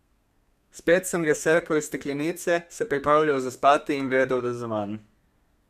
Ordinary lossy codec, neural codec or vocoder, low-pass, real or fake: none; codec, 32 kHz, 1.9 kbps, SNAC; 14.4 kHz; fake